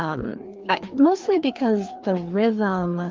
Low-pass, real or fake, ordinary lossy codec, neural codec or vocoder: 7.2 kHz; fake; Opus, 32 kbps; codec, 16 kHz, 2 kbps, FreqCodec, larger model